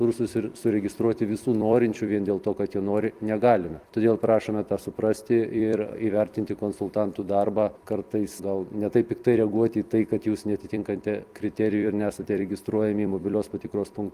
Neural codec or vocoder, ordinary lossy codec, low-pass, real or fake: vocoder, 44.1 kHz, 128 mel bands every 256 samples, BigVGAN v2; Opus, 32 kbps; 14.4 kHz; fake